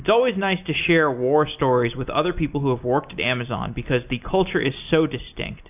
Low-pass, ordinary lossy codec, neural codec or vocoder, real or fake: 3.6 kHz; AAC, 32 kbps; none; real